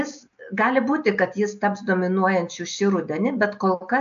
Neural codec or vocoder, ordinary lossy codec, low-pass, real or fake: none; AAC, 64 kbps; 7.2 kHz; real